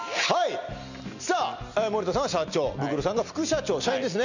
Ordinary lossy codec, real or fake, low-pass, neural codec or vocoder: none; real; 7.2 kHz; none